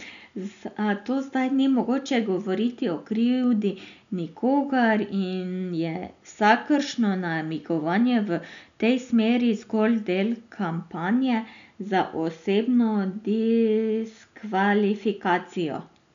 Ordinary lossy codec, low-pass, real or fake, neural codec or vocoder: none; 7.2 kHz; real; none